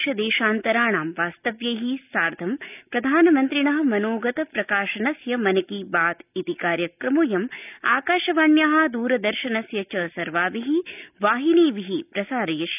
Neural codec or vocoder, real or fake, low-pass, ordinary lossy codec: none; real; 3.6 kHz; none